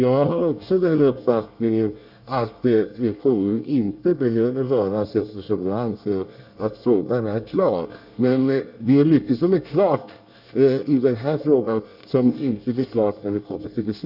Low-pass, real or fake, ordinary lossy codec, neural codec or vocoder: 5.4 kHz; fake; none; codec, 24 kHz, 1 kbps, SNAC